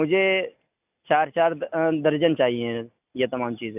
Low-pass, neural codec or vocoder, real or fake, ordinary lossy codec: 3.6 kHz; none; real; AAC, 32 kbps